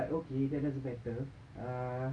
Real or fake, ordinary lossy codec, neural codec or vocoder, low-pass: real; none; none; 9.9 kHz